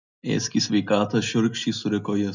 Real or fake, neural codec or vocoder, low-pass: real; none; 7.2 kHz